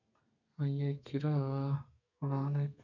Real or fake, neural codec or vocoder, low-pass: fake; codec, 32 kHz, 1.9 kbps, SNAC; 7.2 kHz